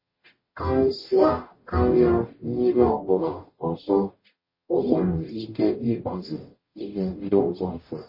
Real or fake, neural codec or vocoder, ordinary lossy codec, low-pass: fake; codec, 44.1 kHz, 0.9 kbps, DAC; MP3, 24 kbps; 5.4 kHz